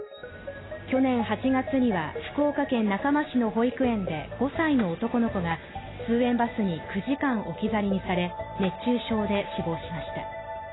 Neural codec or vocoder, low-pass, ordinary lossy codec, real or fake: none; 7.2 kHz; AAC, 16 kbps; real